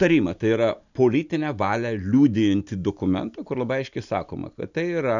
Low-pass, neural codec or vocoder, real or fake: 7.2 kHz; none; real